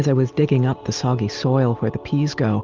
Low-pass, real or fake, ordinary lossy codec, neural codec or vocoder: 7.2 kHz; real; Opus, 32 kbps; none